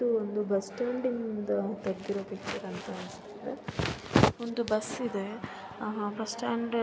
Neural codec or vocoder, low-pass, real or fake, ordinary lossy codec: none; none; real; none